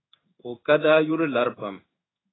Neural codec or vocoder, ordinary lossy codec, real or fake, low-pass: codec, 16 kHz in and 24 kHz out, 1 kbps, XY-Tokenizer; AAC, 16 kbps; fake; 7.2 kHz